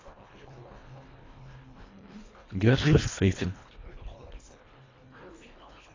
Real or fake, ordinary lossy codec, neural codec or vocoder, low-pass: fake; AAC, 32 kbps; codec, 24 kHz, 1.5 kbps, HILCodec; 7.2 kHz